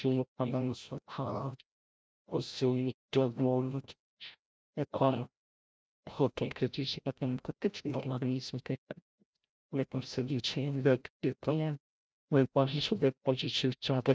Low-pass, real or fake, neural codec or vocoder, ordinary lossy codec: none; fake; codec, 16 kHz, 0.5 kbps, FreqCodec, larger model; none